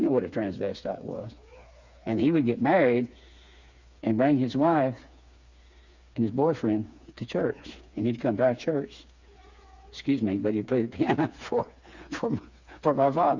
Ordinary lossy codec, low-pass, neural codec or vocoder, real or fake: Opus, 64 kbps; 7.2 kHz; codec, 16 kHz, 4 kbps, FreqCodec, smaller model; fake